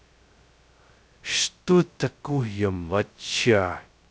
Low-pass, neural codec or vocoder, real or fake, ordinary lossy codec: none; codec, 16 kHz, 0.2 kbps, FocalCodec; fake; none